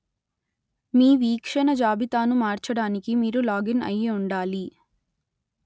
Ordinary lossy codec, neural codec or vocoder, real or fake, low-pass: none; none; real; none